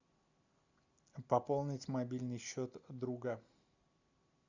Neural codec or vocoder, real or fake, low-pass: none; real; 7.2 kHz